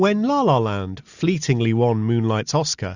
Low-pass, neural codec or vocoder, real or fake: 7.2 kHz; none; real